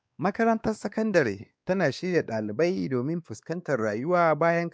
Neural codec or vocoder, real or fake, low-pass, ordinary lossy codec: codec, 16 kHz, 2 kbps, X-Codec, WavLM features, trained on Multilingual LibriSpeech; fake; none; none